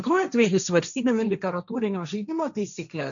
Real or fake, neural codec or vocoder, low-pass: fake; codec, 16 kHz, 1.1 kbps, Voila-Tokenizer; 7.2 kHz